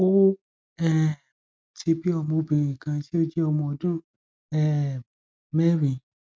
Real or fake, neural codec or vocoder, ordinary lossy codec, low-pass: real; none; none; none